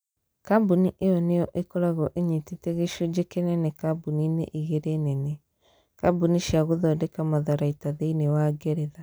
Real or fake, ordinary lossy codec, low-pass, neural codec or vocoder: real; none; none; none